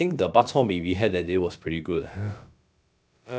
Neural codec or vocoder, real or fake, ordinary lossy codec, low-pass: codec, 16 kHz, about 1 kbps, DyCAST, with the encoder's durations; fake; none; none